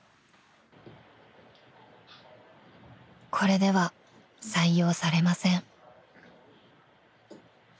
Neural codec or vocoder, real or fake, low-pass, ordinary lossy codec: none; real; none; none